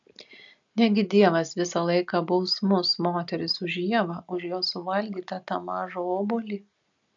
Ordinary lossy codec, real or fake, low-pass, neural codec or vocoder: AAC, 64 kbps; real; 7.2 kHz; none